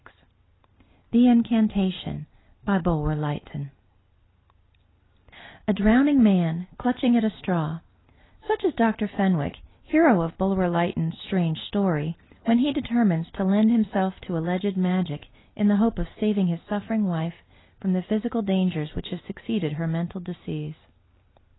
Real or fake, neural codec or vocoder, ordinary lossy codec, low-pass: real; none; AAC, 16 kbps; 7.2 kHz